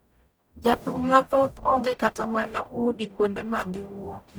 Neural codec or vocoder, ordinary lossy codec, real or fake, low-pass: codec, 44.1 kHz, 0.9 kbps, DAC; none; fake; none